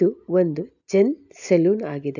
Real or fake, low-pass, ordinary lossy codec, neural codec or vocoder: real; 7.2 kHz; none; none